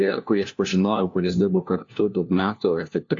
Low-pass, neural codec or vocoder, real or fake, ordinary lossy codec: 7.2 kHz; codec, 16 kHz, 1 kbps, FunCodec, trained on LibriTTS, 50 frames a second; fake; AAC, 48 kbps